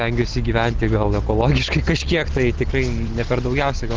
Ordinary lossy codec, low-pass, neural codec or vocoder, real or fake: Opus, 16 kbps; 7.2 kHz; none; real